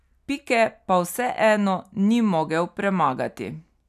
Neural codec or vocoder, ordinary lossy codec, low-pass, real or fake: none; none; 14.4 kHz; real